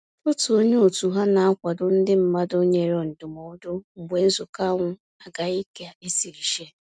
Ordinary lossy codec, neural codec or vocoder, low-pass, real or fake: none; none; none; real